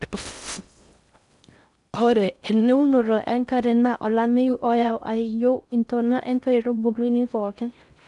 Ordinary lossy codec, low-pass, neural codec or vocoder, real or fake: none; 10.8 kHz; codec, 16 kHz in and 24 kHz out, 0.6 kbps, FocalCodec, streaming, 4096 codes; fake